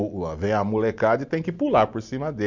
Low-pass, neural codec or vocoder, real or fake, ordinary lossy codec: 7.2 kHz; none; real; none